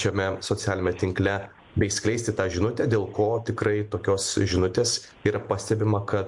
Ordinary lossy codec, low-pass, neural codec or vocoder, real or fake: MP3, 64 kbps; 10.8 kHz; none; real